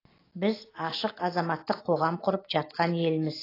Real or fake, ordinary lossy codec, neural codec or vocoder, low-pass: real; AAC, 24 kbps; none; 5.4 kHz